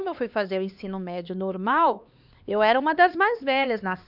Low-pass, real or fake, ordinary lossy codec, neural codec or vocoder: 5.4 kHz; fake; none; codec, 16 kHz, 2 kbps, X-Codec, HuBERT features, trained on LibriSpeech